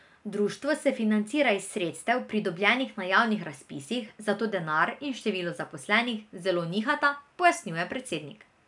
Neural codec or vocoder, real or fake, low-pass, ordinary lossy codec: none; real; 10.8 kHz; none